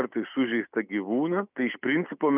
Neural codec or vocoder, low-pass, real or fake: none; 3.6 kHz; real